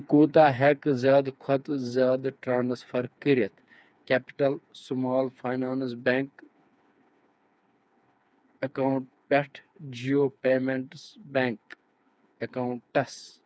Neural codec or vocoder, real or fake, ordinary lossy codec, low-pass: codec, 16 kHz, 4 kbps, FreqCodec, smaller model; fake; none; none